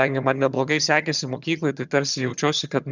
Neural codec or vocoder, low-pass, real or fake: vocoder, 22.05 kHz, 80 mel bands, HiFi-GAN; 7.2 kHz; fake